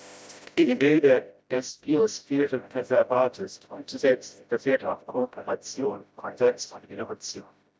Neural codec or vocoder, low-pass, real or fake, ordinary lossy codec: codec, 16 kHz, 0.5 kbps, FreqCodec, smaller model; none; fake; none